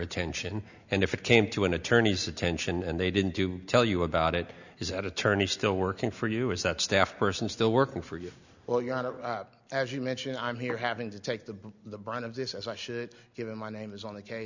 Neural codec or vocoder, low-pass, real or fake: none; 7.2 kHz; real